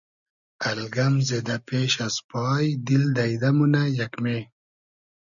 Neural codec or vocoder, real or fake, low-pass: none; real; 7.2 kHz